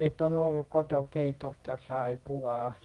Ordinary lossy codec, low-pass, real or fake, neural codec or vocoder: Opus, 24 kbps; 10.8 kHz; fake; codec, 24 kHz, 0.9 kbps, WavTokenizer, medium music audio release